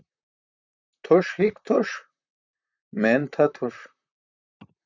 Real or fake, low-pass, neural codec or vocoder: fake; 7.2 kHz; vocoder, 44.1 kHz, 128 mel bands, Pupu-Vocoder